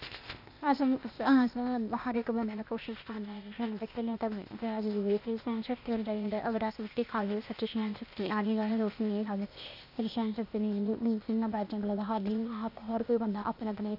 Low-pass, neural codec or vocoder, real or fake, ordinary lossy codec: 5.4 kHz; codec, 16 kHz, 0.8 kbps, ZipCodec; fake; none